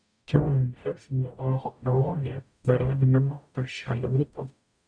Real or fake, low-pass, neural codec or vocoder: fake; 9.9 kHz; codec, 44.1 kHz, 0.9 kbps, DAC